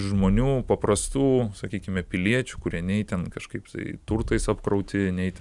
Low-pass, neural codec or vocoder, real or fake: 10.8 kHz; none; real